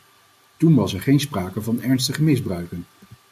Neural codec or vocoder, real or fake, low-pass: none; real; 14.4 kHz